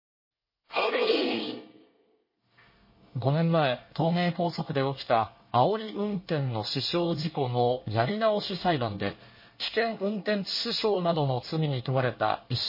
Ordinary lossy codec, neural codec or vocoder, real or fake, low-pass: MP3, 24 kbps; codec, 24 kHz, 1 kbps, SNAC; fake; 5.4 kHz